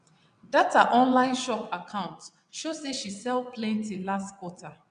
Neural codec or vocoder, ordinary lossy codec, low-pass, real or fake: vocoder, 22.05 kHz, 80 mel bands, WaveNeXt; none; 9.9 kHz; fake